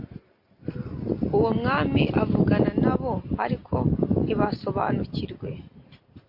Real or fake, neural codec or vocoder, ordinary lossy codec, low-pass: real; none; MP3, 32 kbps; 5.4 kHz